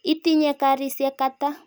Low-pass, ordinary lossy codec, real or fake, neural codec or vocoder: none; none; real; none